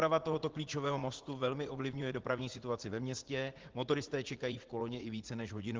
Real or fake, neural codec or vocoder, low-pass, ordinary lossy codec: fake; vocoder, 44.1 kHz, 80 mel bands, Vocos; 7.2 kHz; Opus, 16 kbps